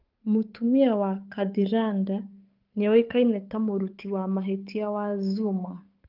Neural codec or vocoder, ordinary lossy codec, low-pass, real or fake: codec, 16 kHz, 8 kbps, FunCodec, trained on Chinese and English, 25 frames a second; Opus, 24 kbps; 5.4 kHz; fake